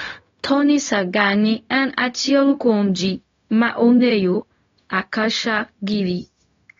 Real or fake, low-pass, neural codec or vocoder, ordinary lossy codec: fake; 7.2 kHz; codec, 16 kHz, 0.4 kbps, LongCat-Audio-Codec; AAC, 32 kbps